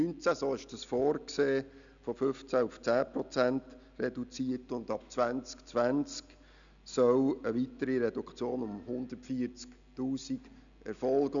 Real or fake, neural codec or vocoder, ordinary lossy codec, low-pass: real; none; none; 7.2 kHz